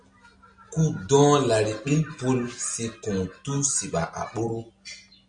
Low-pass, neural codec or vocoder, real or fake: 9.9 kHz; none; real